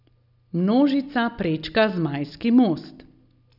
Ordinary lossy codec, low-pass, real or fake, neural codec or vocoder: none; 5.4 kHz; real; none